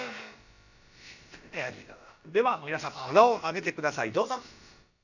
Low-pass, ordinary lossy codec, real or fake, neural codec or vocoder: 7.2 kHz; none; fake; codec, 16 kHz, about 1 kbps, DyCAST, with the encoder's durations